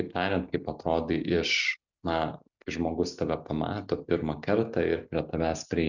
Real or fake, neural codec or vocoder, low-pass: real; none; 7.2 kHz